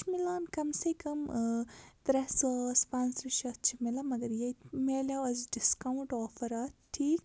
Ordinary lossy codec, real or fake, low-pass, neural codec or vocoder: none; real; none; none